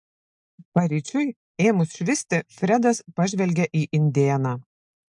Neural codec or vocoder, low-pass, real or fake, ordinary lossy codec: none; 10.8 kHz; real; MP3, 64 kbps